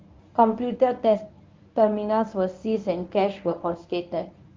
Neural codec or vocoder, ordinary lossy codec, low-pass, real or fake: codec, 24 kHz, 0.9 kbps, WavTokenizer, medium speech release version 1; Opus, 32 kbps; 7.2 kHz; fake